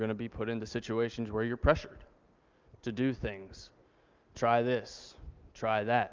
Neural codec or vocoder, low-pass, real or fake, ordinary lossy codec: none; 7.2 kHz; real; Opus, 24 kbps